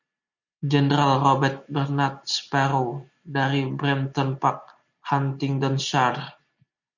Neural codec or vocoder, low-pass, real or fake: none; 7.2 kHz; real